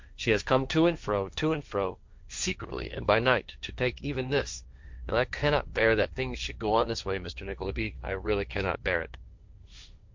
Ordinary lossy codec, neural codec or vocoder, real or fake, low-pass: MP3, 64 kbps; codec, 16 kHz, 1.1 kbps, Voila-Tokenizer; fake; 7.2 kHz